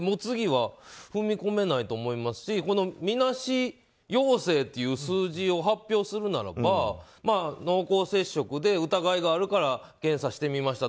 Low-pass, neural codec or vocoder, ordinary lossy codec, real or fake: none; none; none; real